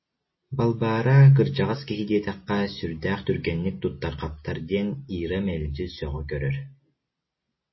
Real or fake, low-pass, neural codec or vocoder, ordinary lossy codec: real; 7.2 kHz; none; MP3, 24 kbps